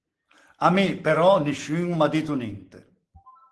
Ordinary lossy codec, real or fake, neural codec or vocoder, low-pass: Opus, 16 kbps; real; none; 10.8 kHz